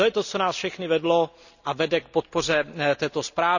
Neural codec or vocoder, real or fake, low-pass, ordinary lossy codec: none; real; 7.2 kHz; none